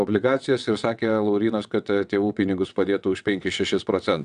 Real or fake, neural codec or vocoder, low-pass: fake; vocoder, 22.05 kHz, 80 mel bands, WaveNeXt; 9.9 kHz